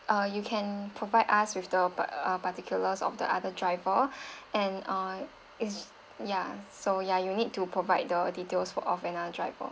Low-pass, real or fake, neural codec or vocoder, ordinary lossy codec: none; real; none; none